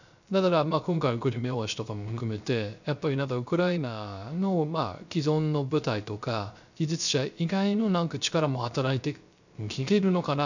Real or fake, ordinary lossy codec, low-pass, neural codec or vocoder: fake; none; 7.2 kHz; codec, 16 kHz, 0.3 kbps, FocalCodec